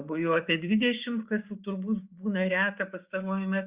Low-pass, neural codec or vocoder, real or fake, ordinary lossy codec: 3.6 kHz; codec, 16 kHz, 6 kbps, DAC; fake; Opus, 64 kbps